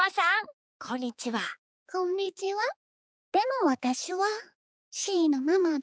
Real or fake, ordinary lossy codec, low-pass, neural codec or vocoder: fake; none; none; codec, 16 kHz, 4 kbps, X-Codec, HuBERT features, trained on balanced general audio